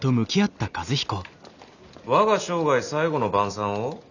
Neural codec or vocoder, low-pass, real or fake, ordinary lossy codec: none; 7.2 kHz; real; none